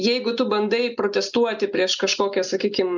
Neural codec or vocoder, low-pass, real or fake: none; 7.2 kHz; real